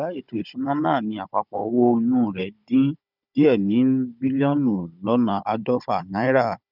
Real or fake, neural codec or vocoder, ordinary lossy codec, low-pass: fake; codec, 16 kHz, 16 kbps, FunCodec, trained on Chinese and English, 50 frames a second; none; 5.4 kHz